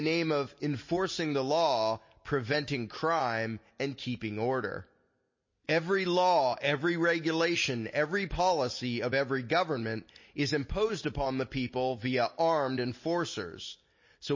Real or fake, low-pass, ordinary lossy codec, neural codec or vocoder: real; 7.2 kHz; MP3, 32 kbps; none